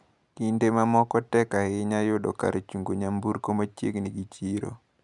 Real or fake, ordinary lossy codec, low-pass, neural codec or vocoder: real; none; 10.8 kHz; none